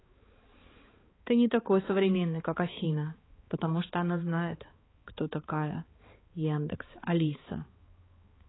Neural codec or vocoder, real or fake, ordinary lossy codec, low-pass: codec, 16 kHz, 4 kbps, X-Codec, HuBERT features, trained on balanced general audio; fake; AAC, 16 kbps; 7.2 kHz